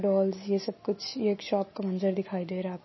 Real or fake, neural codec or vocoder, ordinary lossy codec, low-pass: real; none; MP3, 24 kbps; 7.2 kHz